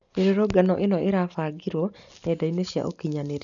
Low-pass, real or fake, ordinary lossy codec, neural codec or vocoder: 7.2 kHz; real; none; none